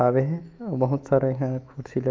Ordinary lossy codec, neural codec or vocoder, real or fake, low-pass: Opus, 24 kbps; autoencoder, 48 kHz, 128 numbers a frame, DAC-VAE, trained on Japanese speech; fake; 7.2 kHz